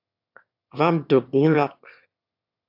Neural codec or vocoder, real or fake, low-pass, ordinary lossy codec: autoencoder, 22.05 kHz, a latent of 192 numbers a frame, VITS, trained on one speaker; fake; 5.4 kHz; AAC, 48 kbps